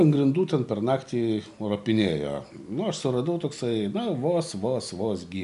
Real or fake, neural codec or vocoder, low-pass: real; none; 10.8 kHz